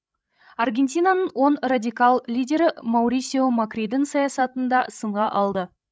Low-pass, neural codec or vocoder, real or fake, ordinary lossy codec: none; codec, 16 kHz, 16 kbps, FreqCodec, larger model; fake; none